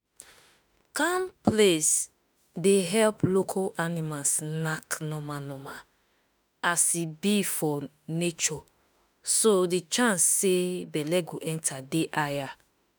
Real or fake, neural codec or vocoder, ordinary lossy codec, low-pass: fake; autoencoder, 48 kHz, 32 numbers a frame, DAC-VAE, trained on Japanese speech; none; none